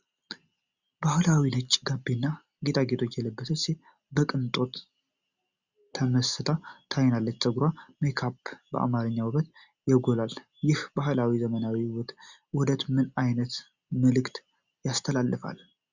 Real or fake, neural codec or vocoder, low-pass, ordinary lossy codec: real; none; 7.2 kHz; Opus, 64 kbps